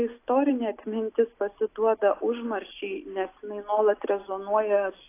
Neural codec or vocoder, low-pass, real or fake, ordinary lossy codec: none; 3.6 kHz; real; AAC, 24 kbps